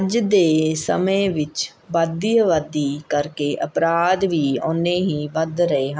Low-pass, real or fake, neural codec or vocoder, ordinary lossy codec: none; real; none; none